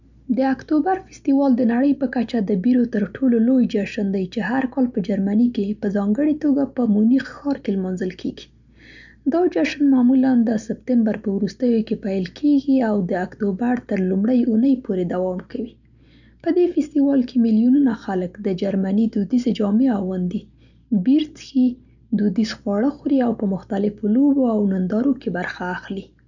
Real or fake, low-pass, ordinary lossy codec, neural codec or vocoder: real; 7.2 kHz; none; none